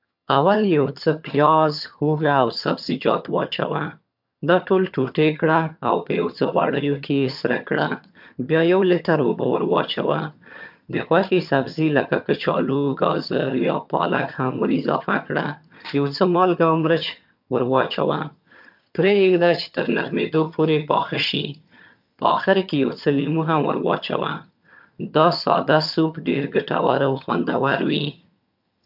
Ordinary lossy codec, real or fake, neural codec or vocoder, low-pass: MP3, 48 kbps; fake; vocoder, 22.05 kHz, 80 mel bands, HiFi-GAN; 5.4 kHz